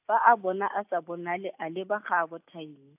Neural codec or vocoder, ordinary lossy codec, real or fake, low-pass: none; AAC, 32 kbps; real; 3.6 kHz